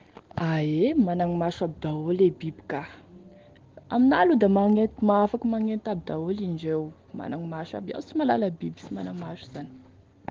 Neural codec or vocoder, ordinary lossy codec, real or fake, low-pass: none; Opus, 16 kbps; real; 7.2 kHz